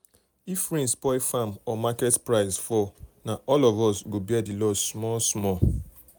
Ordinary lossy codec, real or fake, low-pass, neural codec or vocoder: none; real; none; none